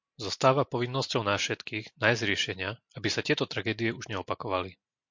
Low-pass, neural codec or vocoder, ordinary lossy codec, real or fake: 7.2 kHz; none; MP3, 48 kbps; real